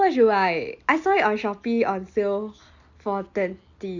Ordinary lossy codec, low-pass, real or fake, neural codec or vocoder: Opus, 64 kbps; 7.2 kHz; real; none